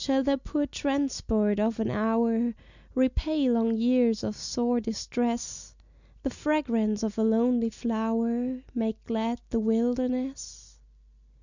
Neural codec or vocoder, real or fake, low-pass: none; real; 7.2 kHz